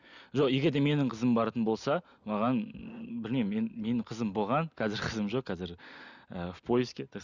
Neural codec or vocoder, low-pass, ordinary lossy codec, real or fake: none; 7.2 kHz; none; real